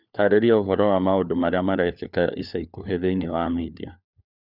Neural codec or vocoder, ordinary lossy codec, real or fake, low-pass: codec, 16 kHz, 4 kbps, FunCodec, trained on LibriTTS, 50 frames a second; none; fake; 5.4 kHz